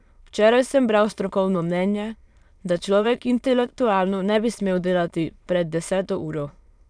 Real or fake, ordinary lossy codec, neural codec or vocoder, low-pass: fake; none; autoencoder, 22.05 kHz, a latent of 192 numbers a frame, VITS, trained on many speakers; none